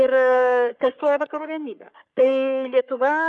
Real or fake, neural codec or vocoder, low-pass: fake; codec, 44.1 kHz, 3.4 kbps, Pupu-Codec; 10.8 kHz